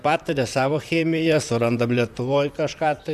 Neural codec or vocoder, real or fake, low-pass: vocoder, 44.1 kHz, 128 mel bands, Pupu-Vocoder; fake; 14.4 kHz